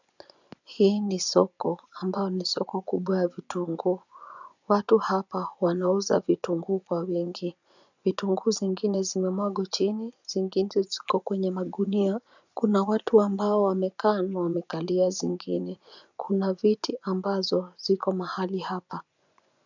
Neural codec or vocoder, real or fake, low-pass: vocoder, 24 kHz, 100 mel bands, Vocos; fake; 7.2 kHz